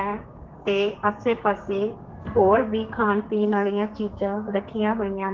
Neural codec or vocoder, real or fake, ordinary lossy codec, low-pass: codec, 32 kHz, 1.9 kbps, SNAC; fake; Opus, 16 kbps; 7.2 kHz